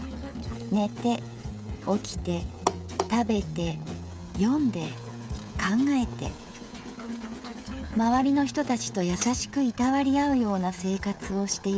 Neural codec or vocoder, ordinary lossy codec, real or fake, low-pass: codec, 16 kHz, 16 kbps, FreqCodec, smaller model; none; fake; none